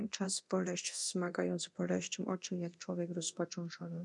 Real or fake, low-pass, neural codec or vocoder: fake; 10.8 kHz; codec, 24 kHz, 0.9 kbps, DualCodec